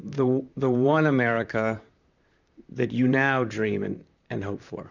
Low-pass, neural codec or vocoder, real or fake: 7.2 kHz; vocoder, 44.1 kHz, 128 mel bands, Pupu-Vocoder; fake